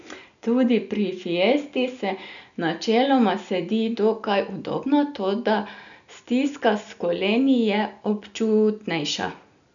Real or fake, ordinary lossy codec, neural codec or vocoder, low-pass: real; none; none; 7.2 kHz